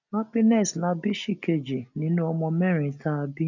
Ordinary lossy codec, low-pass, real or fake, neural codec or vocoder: none; 7.2 kHz; real; none